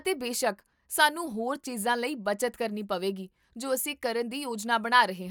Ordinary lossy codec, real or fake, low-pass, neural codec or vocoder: none; real; none; none